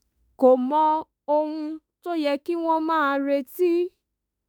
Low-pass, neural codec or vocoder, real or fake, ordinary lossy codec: none; autoencoder, 48 kHz, 32 numbers a frame, DAC-VAE, trained on Japanese speech; fake; none